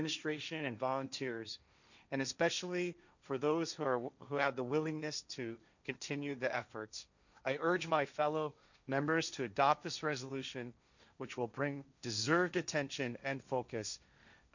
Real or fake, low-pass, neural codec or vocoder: fake; 7.2 kHz; codec, 16 kHz, 1.1 kbps, Voila-Tokenizer